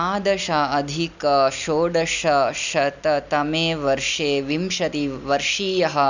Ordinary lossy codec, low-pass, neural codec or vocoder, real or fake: none; 7.2 kHz; none; real